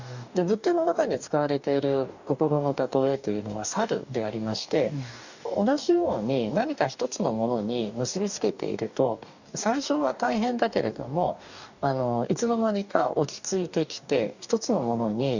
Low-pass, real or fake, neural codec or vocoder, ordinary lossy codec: 7.2 kHz; fake; codec, 44.1 kHz, 2.6 kbps, DAC; none